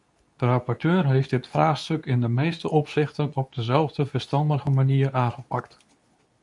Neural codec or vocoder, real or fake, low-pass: codec, 24 kHz, 0.9 kbps, WavTokenizer, medium speech release version 2; fake; 10.8 kHz